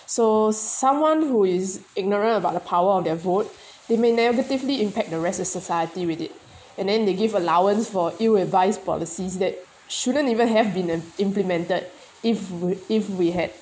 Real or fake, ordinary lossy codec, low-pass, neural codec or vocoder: real; none; none; none